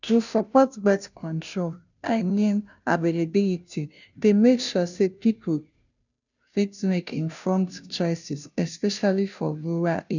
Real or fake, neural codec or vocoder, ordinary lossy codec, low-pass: fake; codec, 16 kHz, 0.5 kbps, FunCodec, trained on Chinese and English, 25 frames a second; none; 7.2 kHz